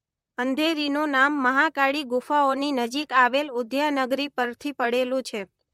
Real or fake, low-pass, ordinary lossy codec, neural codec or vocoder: fake; 19.8 kHz; MP3, 64 kbps; vocoder, 44.1 kHz, 128 mel bands, Pupu-Vocoder